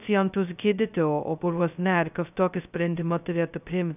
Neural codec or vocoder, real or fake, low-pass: codec, 16 kHz, 0.2 kbps, FocalCodec; fake; 3.6 kHz